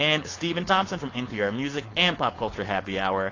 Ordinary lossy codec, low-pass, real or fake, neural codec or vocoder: AAC, 32 kbps; 7.2 kHz; fake; codec, 16 kHz, 4.8 kbps, FACodec